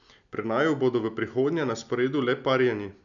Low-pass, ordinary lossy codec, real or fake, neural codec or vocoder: 7.2 kHz; none; real; none